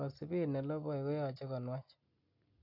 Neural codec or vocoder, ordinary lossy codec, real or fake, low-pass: none; none; real; 5.4 kHz